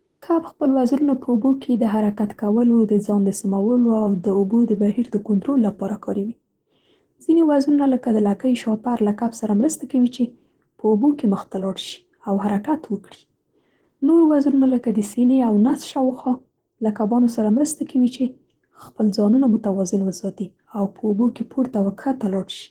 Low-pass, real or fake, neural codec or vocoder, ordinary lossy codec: 19.8 kHz; fake; vocoder, 44.1 kHz, 128 mel bands, Pupu-Vocoder; Opus, 16 kbps